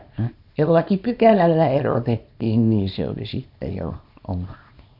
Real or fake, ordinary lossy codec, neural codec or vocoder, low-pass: fake; AAC, 48 kbps; codec, 24 kHz, 0.9 kbps, WavTokenizer, small release; 5.4 kHz